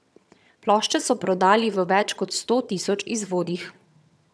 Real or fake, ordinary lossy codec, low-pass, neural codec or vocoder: fake; none; none; vocoder, 22.05 kHz, 80 mel bands, HiFi-GAN